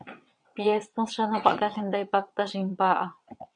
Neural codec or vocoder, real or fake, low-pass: vocoder, 22.05 kHz, 80 mel bands, WaveNeXt; fake; 9.9 kHz